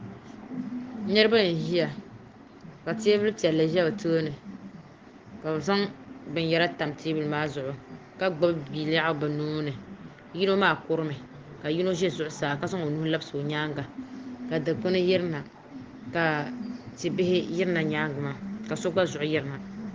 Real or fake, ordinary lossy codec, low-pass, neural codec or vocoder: real; Opus, 16 kbps; 7.2 kHz; none